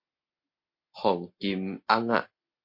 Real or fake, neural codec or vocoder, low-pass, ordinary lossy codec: real; none; 5.4 kHz; MP3, 32 kbps